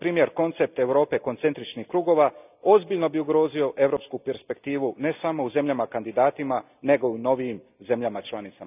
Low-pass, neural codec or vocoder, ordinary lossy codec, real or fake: 3.6 kHz; none; none; real